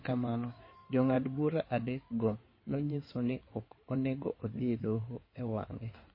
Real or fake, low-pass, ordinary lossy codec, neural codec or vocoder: fake; 5.4 kHz; MP3, 24 kbps; codec, 16 kHz in and 24 kHz out, 2.2 kbps, FireRedTTS-2 codec